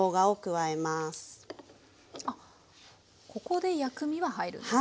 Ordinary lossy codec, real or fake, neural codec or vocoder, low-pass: none; real; none; none